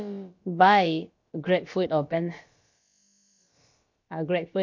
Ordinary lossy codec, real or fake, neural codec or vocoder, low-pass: MP3, 48 kbps; fake; codec, 16 kHz, about 1 kbps, DyCAST, with the encoder's durations; 7.2 kHz